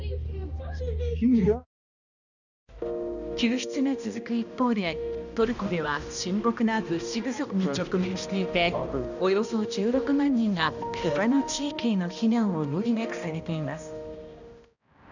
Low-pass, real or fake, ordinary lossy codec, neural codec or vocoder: 7.2 kHz; fake; none; codec, 16 kHz, 1 kbps, X-Codec, HuBERT features, trained on balanced general audio